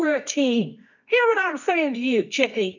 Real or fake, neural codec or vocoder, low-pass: fake; codec, 24 kHz, 0.9 kbps, WavTokenizer, medium music audio release; 7.2 kHz